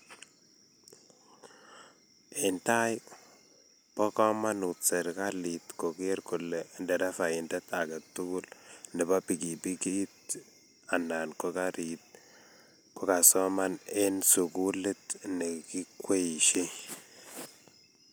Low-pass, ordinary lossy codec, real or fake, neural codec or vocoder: none; none; real; none